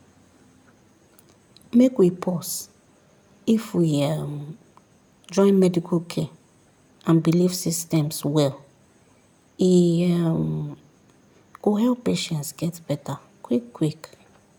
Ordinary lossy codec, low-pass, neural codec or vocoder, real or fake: none; 19.8 kHz; none; real